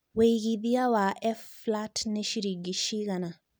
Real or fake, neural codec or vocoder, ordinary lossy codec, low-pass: real; none; none; none